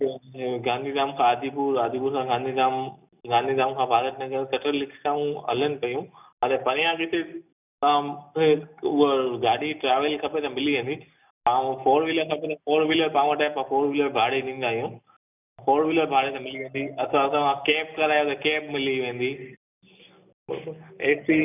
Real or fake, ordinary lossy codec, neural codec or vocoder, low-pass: real; none; none; 3.6 kHz